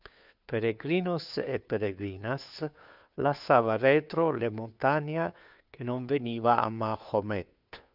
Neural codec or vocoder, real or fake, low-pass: codec, 16 kHz, 2 kbps, FunCodec, trained on Chinese and English, 25 frames a second; fake; 5.4 kHz